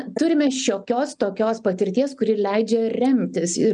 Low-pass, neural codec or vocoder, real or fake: 9.9 kHz; none; real